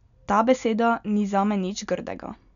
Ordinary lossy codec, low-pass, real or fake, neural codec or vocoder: none; 7.2 kHz; real; none